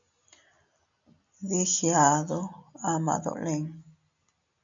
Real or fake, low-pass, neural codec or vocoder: real; 7.2 kHz; none